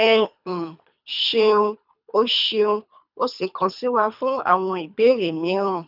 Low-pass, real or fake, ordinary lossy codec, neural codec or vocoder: 5.4 kHz; fake; none; codec, 24 kHz, 3 kbps, HILCodec